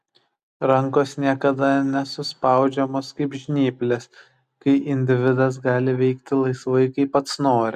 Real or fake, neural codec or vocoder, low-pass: real; none; 14.4 kHz